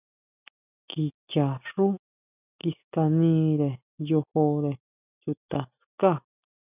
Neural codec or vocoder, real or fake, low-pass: none; real; 3.6 kHz